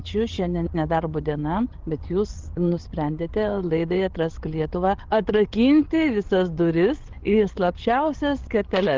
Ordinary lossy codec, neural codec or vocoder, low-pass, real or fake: Opus, 16 kbps; codec, 16 kHz, 8 kbps, FreqCodec, larger model; 7.2 kHz; fake